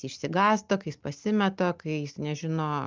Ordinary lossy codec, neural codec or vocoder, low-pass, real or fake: Opus, 24 kbps; none; 7.2 kHz; real